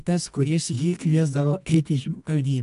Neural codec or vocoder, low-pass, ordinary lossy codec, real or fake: codec, 24 kHz, 0.9 kbps, WavTokenizer, medium music audio release; 10.8 kHz; AAC, 96 kbps; fake